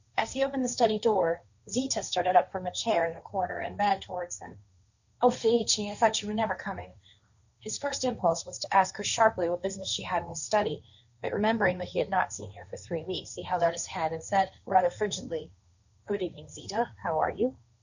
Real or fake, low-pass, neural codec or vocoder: fake; 7.2 kHz; codec, 16 kHz, 1.1 kbps, Voila-Tokenizer